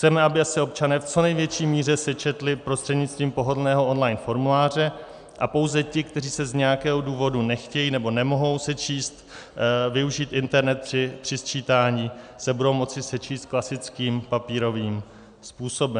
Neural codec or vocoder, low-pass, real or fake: none; 9.9 kHz; real